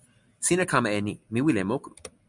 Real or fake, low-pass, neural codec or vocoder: real; 10.8 kHz; none